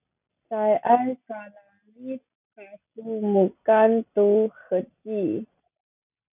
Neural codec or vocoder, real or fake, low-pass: none; real; 3.6 kHz